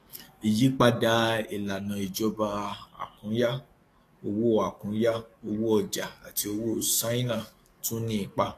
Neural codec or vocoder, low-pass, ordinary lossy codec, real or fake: codec, 44.1 kHz, 7.8 kbps, DAC; 14.4 kHz; AAC, 48 kbps; fake